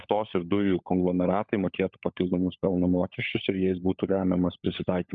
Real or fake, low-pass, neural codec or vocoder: fake; 7.2 kHz; codec, 16 kHz, 16 kbps, FunCodec, trained on LibriTTS, 50 frames a second